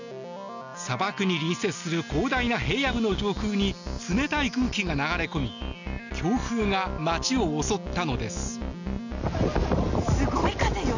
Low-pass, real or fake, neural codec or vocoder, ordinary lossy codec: 7.2 kHz; real; none; none